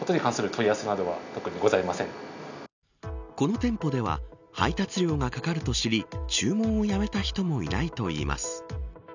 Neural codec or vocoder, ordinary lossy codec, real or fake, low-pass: none; none; real; 7.2 kHz